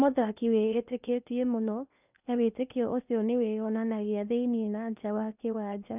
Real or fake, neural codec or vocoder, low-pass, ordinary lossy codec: fake; codec, 16 kHz in and 24 kHz out, 0.8 kbps, FocalCodec, streaming, 65536 codes; 3.6 kHz; none